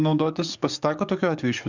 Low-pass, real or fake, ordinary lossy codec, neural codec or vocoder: 7.2 kHz; fake; Opus, 64 kbps; codec, 44.1 kHz, 7.8 kbps, DAC